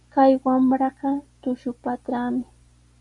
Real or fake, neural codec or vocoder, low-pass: real; none; 10.8 kHz